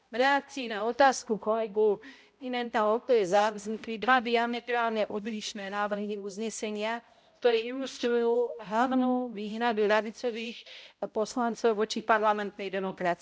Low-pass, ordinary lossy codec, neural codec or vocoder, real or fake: none; none; codec, 16 kHz, 0.5 kbps, X-Codec, HuBERT features, trained on balanced general audio; fake